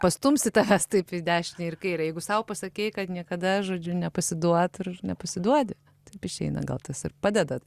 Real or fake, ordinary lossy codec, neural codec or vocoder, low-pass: real; Opus, 64 kbps; none; 14.4 kHz